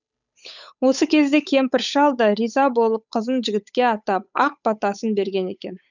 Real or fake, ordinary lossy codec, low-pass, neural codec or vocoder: fake; none; 7.2 kHz; codec, 16 kHz, 8 kbps, FunCodec, trained on Chinese and English, 25 frames a second